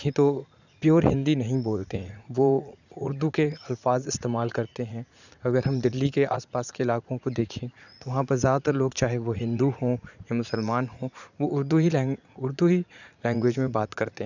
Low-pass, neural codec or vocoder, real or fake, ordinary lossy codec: 7.2 kHz; vocoder, 44.1 kHz, 80 mel bands, Vocos; fake; none